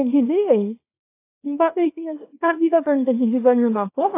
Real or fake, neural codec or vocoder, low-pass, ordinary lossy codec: fake; codec, 24 kHz, 0.9 kbps, WavTokenizer, small release; 3.6 kHz; AAC, 24 kbps